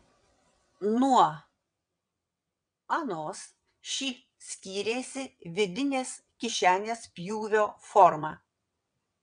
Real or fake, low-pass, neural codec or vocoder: fake; 9.9 kHz; vocoder, 22.05 kHz, 80 mel bands, WaveNeXt